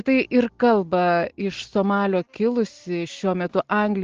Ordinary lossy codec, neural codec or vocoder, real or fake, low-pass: Opus, 16 kbps; none; real; 7.2 kHz